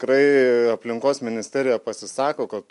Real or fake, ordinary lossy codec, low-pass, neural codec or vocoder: real; MP3, 64 kbps; 10.8 kHz; none